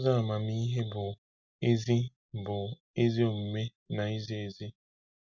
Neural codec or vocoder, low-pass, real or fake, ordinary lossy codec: none; 7.2 kHz; real; none